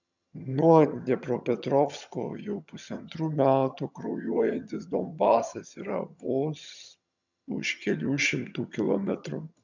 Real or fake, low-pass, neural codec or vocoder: fake; 7.2 kHz; vocoder, 22.05 kHz, 80 mel bands, HiFi-GAN